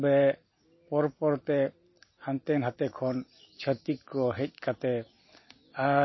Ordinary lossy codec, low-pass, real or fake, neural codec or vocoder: MP3, 24 kbps; 7.2 kHz; real; none